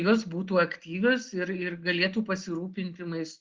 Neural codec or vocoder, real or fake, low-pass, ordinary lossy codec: none; real; 7.2 kHz; Opus, 16 kbps